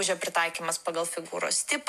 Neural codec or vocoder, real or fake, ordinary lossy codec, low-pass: none; real; MP3, 96 kbps; 14.4 kHz